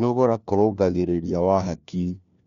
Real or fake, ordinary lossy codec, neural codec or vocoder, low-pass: fake; none; codec, 16 kHz, 1 kbps, FunCodec, trained on LibriTTS, 50 frames a second; 7.2 kHz